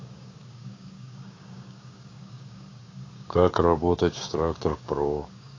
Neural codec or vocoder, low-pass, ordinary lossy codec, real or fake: codec, 16 kHz, 6 kbps, DAC; 7.2 kHz; AAC, 32 kbps; fake